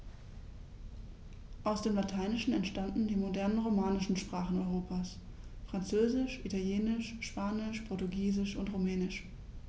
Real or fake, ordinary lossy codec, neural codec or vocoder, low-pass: real; none; none; none